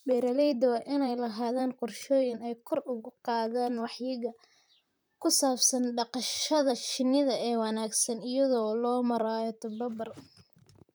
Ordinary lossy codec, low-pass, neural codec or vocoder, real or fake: none; none; vocoder, 44.1 kHz, 128 mel bands, Pupu-Vocoder; fake